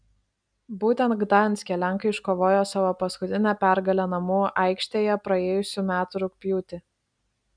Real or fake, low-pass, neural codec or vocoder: real; 9.9 kHz; none